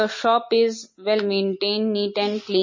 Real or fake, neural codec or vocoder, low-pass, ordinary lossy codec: real; none; 7.2 kHz; MP3, 32 kbps